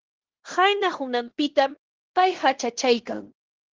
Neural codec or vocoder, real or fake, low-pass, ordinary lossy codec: codec, 16 kHz in and 24 kHz out, 0.9 kbps, LongCat-Audio-Codec, fine tuned four codebook decoder; fake; 7.2 kHz; Opus, 16 kbps